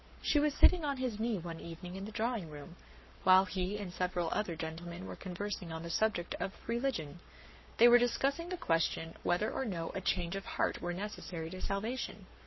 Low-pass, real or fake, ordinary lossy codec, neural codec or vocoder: 7.2 kHz; fake; MP3, 24 kbps; codec, 44.1 kHz, 7.8 kbps, Pupu-Codec